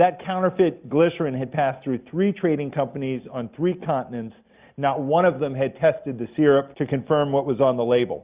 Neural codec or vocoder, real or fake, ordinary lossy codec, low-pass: none; real; Opus, 64 kbps; 3.6 kHz